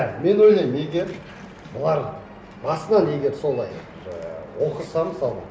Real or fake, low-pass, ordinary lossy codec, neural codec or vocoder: real; none; none; none